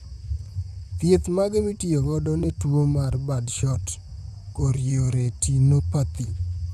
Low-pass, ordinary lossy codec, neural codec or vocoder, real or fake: 14.4 kHz; none; vocoder, 44.1 kHz, 128 mel bands, Pupu-Vocoder; fake